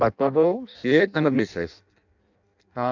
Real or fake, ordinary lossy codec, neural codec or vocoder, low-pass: fake; none; codec, 16 kHz in and 24 kHz out, 0.6 kbps, FireRedTTS-2 codec; 7.2 kHz